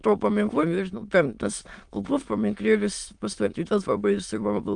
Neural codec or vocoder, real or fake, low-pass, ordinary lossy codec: autoencoder, 22.05 kHz, a latent of 192 numbers a frame, VITS, trained on many speakers; fake; 9.9 kHz; Opus, 32 kbps